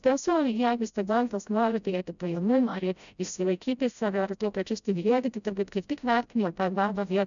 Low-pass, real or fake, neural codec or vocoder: 7.2 kHz; fake; codec, 16 kHz, 0.5 kbps, FreqCodec, smaller model